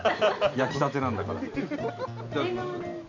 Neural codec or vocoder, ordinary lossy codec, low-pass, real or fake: none; none; 7.2 kHz; real